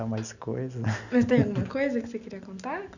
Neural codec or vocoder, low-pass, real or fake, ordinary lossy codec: none; 7.2 kHz; real; none